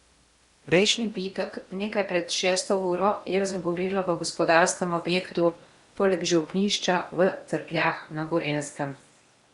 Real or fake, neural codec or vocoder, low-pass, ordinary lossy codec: fake; codec, 16 kHz in and 24 kHz out, 0.8 kbps, FocalCodec, streaming, 65536 codes; 10.8 kHz; Opus, 64 kbps